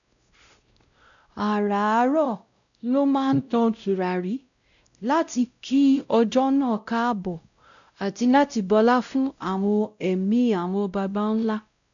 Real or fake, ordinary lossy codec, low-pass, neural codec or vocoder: fake; none; 7.2 kHz; codec, 16 kHz, 0.5 kbps, X-Codec, WavLM features, trained on Multilingual LibriSpeech